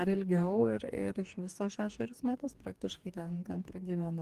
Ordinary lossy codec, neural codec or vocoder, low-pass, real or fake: Opus, 24 kbps; codec, 44.1 kHz, 2.6 kbps, DAC; 14.4 kHz; fake